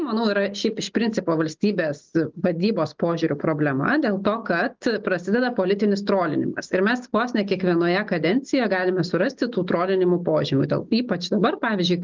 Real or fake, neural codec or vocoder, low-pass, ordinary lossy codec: real; none; 7.2 kHz; Opus, 32 kbps